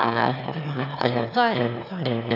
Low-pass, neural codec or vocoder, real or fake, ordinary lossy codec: 5.4 kHz; autoencoder, 22.05 kHz, a latent of 192 numbers a frame, VITS, trained on one speaker; fake; none